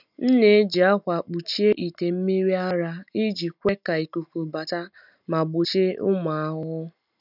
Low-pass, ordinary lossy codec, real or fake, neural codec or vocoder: 5.4 kHz; none; real; none